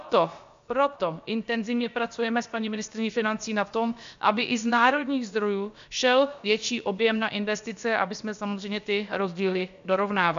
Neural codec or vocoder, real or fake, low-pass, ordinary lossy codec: codec, 16 kHz, about 1 kbps, DyCAST, with the encoder's durations; fake; 7.2 kHz; MP3, 64 kbps